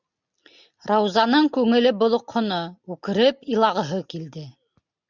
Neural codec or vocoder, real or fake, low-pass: none; real; 7.2 kHz